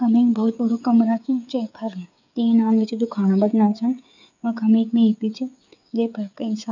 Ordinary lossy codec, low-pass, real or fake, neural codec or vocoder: none; 7.2 kHz; fake; codec, 16 kHz, 8 kbps, FreqCodec, smaller model